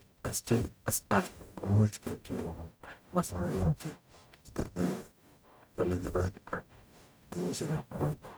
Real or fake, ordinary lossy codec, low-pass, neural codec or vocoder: fake; none; none; codec, 44.1 kHz, 0.9 kbps, DAC